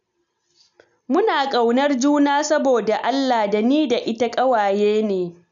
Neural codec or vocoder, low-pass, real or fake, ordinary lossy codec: none; 7.2 kHz; real; none